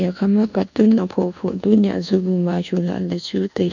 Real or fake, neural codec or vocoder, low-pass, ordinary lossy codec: fake; codec, 24 kHz, 1.2 kbps, DualCodec; 7.2 kHz; none